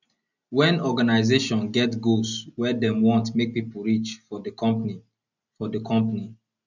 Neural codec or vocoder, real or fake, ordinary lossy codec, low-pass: none; real; none; 7.2 kHz